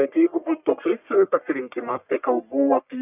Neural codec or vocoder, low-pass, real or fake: codec, 44.1 kHz, 1.7 kbps, Pupu-Codec; 3.6 kHz; fake